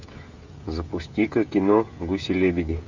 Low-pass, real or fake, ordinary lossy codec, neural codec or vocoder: 7.2 kHz; fake; Opus, 64 kbps; codec, 16 kHz, 16 kbps, FreqCodec, smaller model